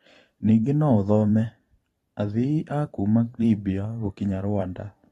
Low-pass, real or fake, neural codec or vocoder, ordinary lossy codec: 19.8 kHz; fake; vocoder, 44.1 kHz, 128 mel bands every 512 samples, BigVGAN v2; AAC, 32 kbps